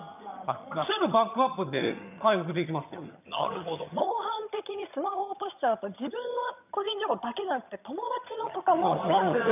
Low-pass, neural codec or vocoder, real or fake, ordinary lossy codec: 3.6 kHz; vocoder, 22.05 kHz, 80 mel bands, HiFi-GAN; fake; none